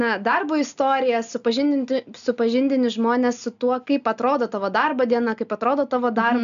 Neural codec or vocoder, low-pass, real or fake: none; 7.2 kHz; real